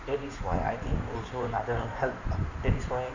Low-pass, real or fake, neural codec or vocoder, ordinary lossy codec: 7.2 kHz; fake; codec, 16 kHz in and 24 kHz out, 2.2 kbps, FireRedTTS-2 codec; none